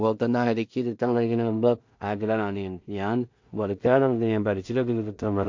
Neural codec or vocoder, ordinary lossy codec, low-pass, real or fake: codec, 16 kHz in and 24 kHz out, 0.4 kbps, LongCat-Audio-Codec, two codebook decoder; MP3, 48 kbps; 7.2 kHz; fake